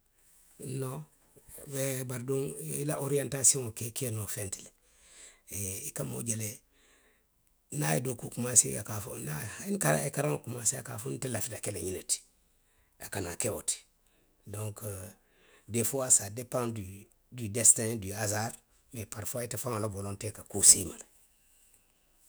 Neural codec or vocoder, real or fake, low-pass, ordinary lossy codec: autoencoder, 48 kHz, 128 numbers a frame, DAC-VAE, trained on Japanese speech; fake; none; none